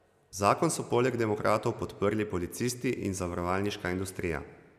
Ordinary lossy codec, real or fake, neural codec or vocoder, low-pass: none; real; none; 14.4 kHz